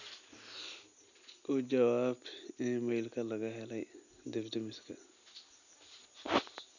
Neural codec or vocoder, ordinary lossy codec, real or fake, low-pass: none; none; real; 7.2 kHz